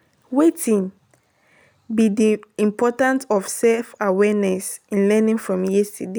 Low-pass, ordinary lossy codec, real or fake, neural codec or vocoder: none; none; real; none